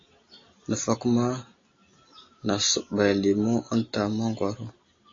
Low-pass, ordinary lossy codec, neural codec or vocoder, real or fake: 7.2 kHz; AAC, 32 kbps; none; real